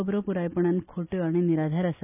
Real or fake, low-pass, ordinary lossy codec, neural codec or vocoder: real; 3.6 kHz; none; none